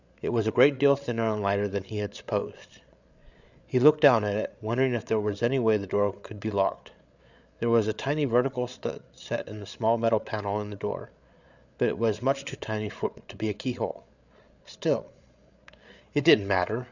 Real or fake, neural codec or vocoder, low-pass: fake; codec, 16 kHz, 8 kbps, FreqCodec, larger model; 7.2 kHz